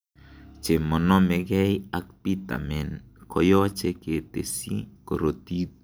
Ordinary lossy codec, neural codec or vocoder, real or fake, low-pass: none; none; real; none